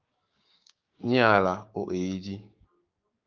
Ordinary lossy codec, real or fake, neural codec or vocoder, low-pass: Opus, 24 kbps; fake; codec, 16 kHz, 6 kbps, DAC; 7.2 kHz